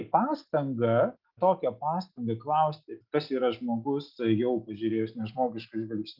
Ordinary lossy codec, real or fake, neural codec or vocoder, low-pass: Opus, 24 kbps; real; none; 5.4 kHz